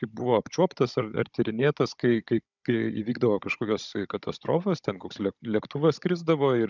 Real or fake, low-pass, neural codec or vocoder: fake; 7.2 kHz; codec, 16 kHz, 16 kbps, FunCodec, trained on Chinese and English, 50 frames a second